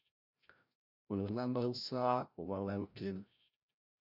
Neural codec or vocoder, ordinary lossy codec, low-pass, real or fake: codec, 16 kHz, 0.5 kbps, FreqCodec, larger model; MP3, 48 kbps; 5.4 kHz; fake